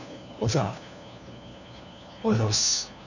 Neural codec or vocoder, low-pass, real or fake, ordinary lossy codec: codec, 16 kHz, 1 kbps, FunCodec, trained on LibriTTS, 50 frames a second; 7.2 kHz; fake; none